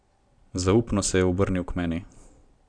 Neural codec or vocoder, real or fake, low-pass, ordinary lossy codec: none; real; 9.9 kHz; none